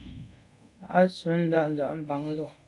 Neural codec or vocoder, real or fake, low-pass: codec, 24 kHz, 0.5 kbps, DualCodec; fake; 10.8 kHz